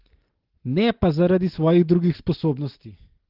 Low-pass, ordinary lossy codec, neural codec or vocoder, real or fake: 5.4 kHz; Opus, 16 kbps; none; real